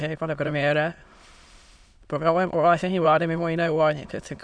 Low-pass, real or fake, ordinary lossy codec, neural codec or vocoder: 9.9 kHz; fake; Opus, 64 kbps; autoencoder, 22.05 kHz, a latent of 192 numbers a frame, VITS, trained on many speakers